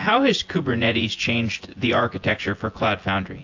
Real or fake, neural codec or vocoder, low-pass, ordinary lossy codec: fake; vocoder, 24 kHz, 100 mel bands, Vocos; 7.2 kHz; AAC, 48 kbps